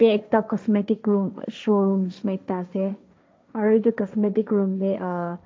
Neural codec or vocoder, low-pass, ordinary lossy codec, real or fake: codec, 16 kHz, 1.1 kbps, Voila-Tokenizer; none; none; fake